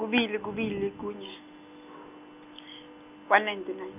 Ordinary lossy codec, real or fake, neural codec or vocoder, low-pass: none; real; none; 3.6 kHz